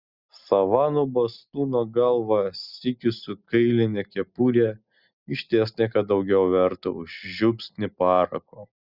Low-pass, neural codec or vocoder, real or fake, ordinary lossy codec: 5.4 kHz; none; real; Opus, 64 kbps